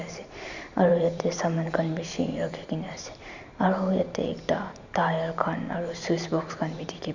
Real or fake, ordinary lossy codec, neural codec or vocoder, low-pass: fake; none; vocoder, 44.1 kHz, 128 mel bands every 512 samples, BigVGAN v2; 7.2 kHz